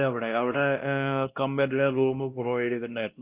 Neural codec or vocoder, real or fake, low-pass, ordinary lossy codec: codec, 16 kHz, 1 kbps, X-Codec, WavLM features, trained on Multilingual LibriSpeech; fake; 3.6 kHz; Opus, 32 kbps